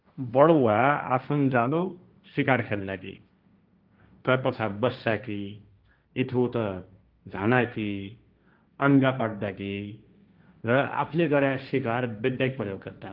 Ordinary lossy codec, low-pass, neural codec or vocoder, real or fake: Opus, 24 kbps; 5.4 kHz; codec, 16 kHz, 1.1 kbps, Voila-Tokenizer; fake